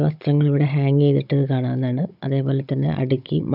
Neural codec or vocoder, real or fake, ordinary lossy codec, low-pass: codec, 16 kHz, 16 kbps, FunCodec, trained on Chinese and English, 50 frames a second; fake; none; 5.4 kHz